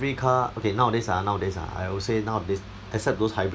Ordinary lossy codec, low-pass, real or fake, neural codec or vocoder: none; none; real; none